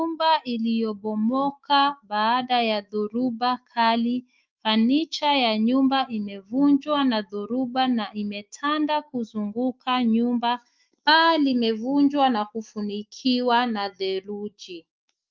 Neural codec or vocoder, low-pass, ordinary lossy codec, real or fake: autoencoder, 48 kHz, 128 numbers a frame, DAC-VAE, trained on Japanese speech; 7.2 kHz; Opus, 32 kbps; fake